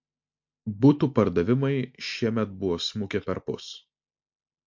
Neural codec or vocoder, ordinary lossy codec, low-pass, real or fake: none; MP3, 48 kbps; 7.2 kHz; real